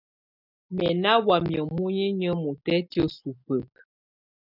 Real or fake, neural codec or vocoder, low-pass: real; none; 5.4 kHz